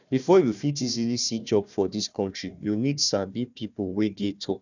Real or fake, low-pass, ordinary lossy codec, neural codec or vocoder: fake; 7.2 kHz; none; codec, 16 kHz, 1 kbps, FunCodec, trained on Chinese and English, 50 frames a second